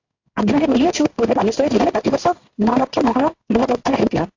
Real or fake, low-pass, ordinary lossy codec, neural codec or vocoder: fake; 7.2 kHz; AAC, 48 kbps; codec, 16 kHz in and 24 kHz out, 1 kbps, XY-Tokenizer